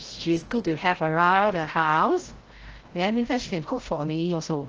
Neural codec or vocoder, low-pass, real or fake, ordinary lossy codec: codec, 16 kHz, 0.5 kbps, FreqCodec, larger model; 7.2 kHz; fake; Opus, 16 kbps